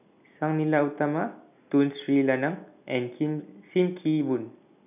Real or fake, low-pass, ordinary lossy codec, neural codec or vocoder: real; 3.6 kHz; none; none